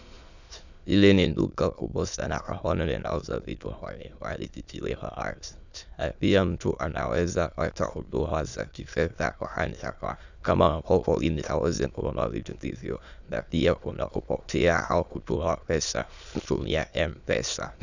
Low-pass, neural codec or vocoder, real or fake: 7.2 kHz; autoencoder, 22.05 kHz, a latent of 192 numbers a frame, VITS, trained on many speakers; fake